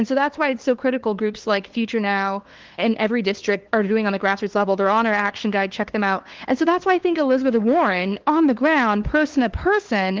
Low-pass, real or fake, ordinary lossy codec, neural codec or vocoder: 7.2 kHz; fake; Opus, 16 kbps; codec, 16 kHz, 2 kbps, FunCodec, trained on LibriTTS, 25 frames a second